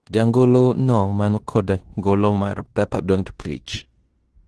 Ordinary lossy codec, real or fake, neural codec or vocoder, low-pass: Opus, 16 kbps; fake; codec, 16 kHz in and 24 kHz out, 0.9 kbps, LongCat-Audio-Codec, fine tuned four codebook decoder; 10.8 kHz